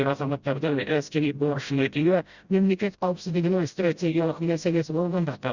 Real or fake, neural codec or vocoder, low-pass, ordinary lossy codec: fake; codec, 16 kHz, 0.5 kbps, FreqCodec, smaller model; 7.2 kHz; Opus, 64 kbps